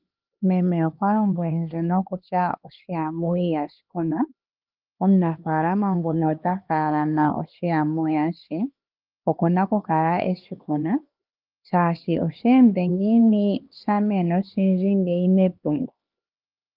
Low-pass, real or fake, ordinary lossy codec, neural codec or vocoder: 5.4 kHz; fake; Opus, 32 kbps; codec, 16 kHz, 2 kbps, X-Codec, HuBERT features, trained on LibriSpeech